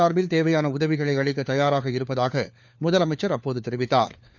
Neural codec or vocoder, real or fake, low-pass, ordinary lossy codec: codec, 16 kHz, 4 kbps, FunCodec, trained on LibriTTS, 50 frames a second; fake; 7.2 kHz; none